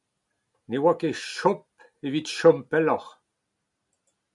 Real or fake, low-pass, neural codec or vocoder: real; 10.8 kHz; none